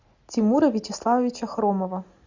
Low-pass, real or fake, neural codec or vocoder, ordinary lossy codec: 7.2 kHz; real; none; Opus, 64 kbps